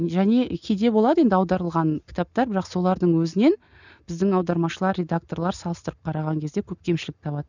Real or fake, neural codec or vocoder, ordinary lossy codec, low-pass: real; none; none; 7.2 kHz